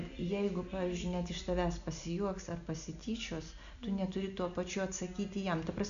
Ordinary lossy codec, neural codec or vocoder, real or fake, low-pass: MP3, 96 kbps; none; real; 7.2 kHz